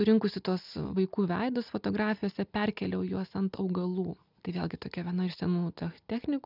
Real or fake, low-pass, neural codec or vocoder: real; 5.4 kHz; none